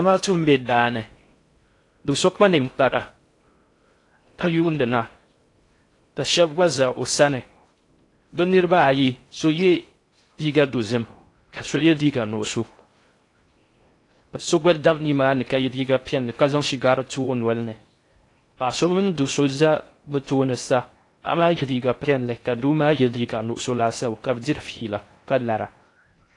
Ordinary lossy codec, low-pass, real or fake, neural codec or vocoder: AAC, 48 kbps; 10.8 kHz; fake; codec, 16 kHz in and 24 kHz out, 0.6 kbps, FocalCodec, streaming, 4096 codes